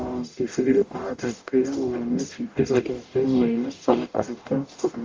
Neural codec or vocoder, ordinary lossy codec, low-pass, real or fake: codec, 44.1 kHz, 0.9 kbps, DAC; Opus, 32 kbps; 7.2 kHz; fake